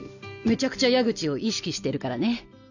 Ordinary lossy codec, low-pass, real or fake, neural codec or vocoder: none; 7.2 kHz; real; none